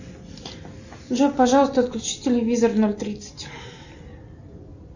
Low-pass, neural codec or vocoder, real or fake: 7.2 kHz; none; real